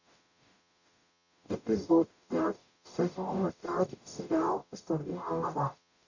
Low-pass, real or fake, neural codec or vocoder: 7.2 kHz; fake; codec, 44.1 kHz, 0.9 kbps, DAC